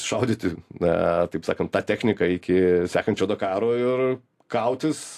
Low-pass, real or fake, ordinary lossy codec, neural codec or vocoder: 14.4 kHz; real; AAC, 64 kbps; none